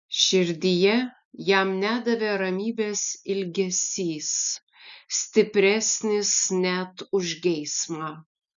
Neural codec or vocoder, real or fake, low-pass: none; real; 7.2 kHz